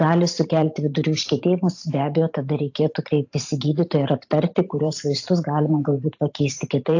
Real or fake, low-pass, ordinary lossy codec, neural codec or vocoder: fake; 7.2 kHz; AAC, 48 kbps; vocoder, 44.1 kHz, 128 mel bands every 512 samples, BigVGAN v2